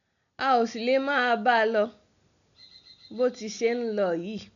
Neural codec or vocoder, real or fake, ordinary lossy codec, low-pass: none; real; none; 7.2 kHz